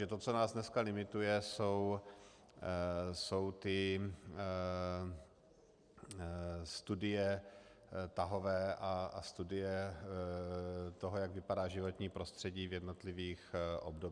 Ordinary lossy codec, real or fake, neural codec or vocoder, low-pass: Opus, 64 kbps; real; none; 9.9 kHz